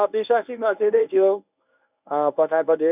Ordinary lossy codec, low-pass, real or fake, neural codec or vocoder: none; 3.6 kHz; fake; codec, 24 kHz, 0.9 kbps, WavTokenizer, medium speech release version 1